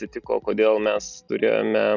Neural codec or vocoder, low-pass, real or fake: none; 7.2 kHz; real